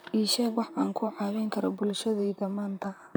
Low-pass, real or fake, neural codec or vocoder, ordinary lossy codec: none; fake; vocoder, 44.1 kHz, 128 mel bands, Pupu-Vocoder; none